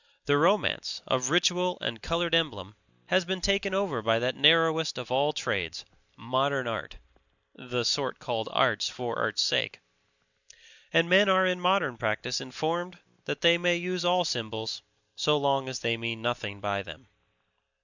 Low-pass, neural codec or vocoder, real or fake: 7.2 kHz; none; real